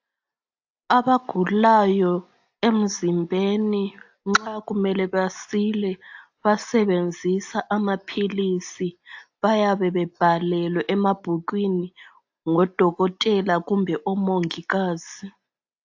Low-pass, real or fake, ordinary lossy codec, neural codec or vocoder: 7.2 kHz; real; AAC, 48 kbps; none